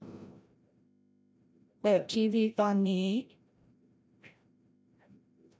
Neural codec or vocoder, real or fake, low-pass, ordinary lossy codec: codec, 16 kHz, 0.5 kbps, FreqCodec, larger model; fake; none; none